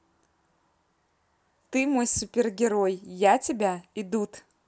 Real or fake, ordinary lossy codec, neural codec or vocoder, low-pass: real; none; none; none